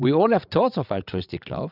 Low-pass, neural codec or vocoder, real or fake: 5.4 kHz; codec, 16 kHz, 16 kbps, FreqCodec, larger model; fake